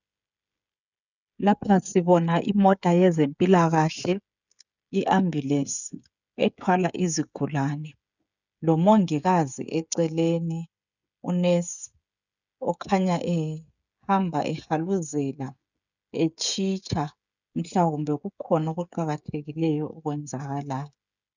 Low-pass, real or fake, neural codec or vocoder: 7.2 kHz; fake; codec, 16 kHz, 16 kbps, FreqCodec, smaller model